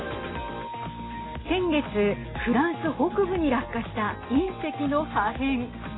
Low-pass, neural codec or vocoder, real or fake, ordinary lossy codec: 7.2 kHz; none; real; AAC, 16 kbps